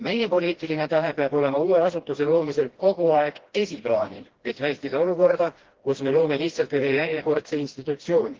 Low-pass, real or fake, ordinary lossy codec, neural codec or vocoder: 7.2 kHz; fake; Opus, 16 kbps; codec, 16 kHz, 1 kbps, FreqCodec, smaller model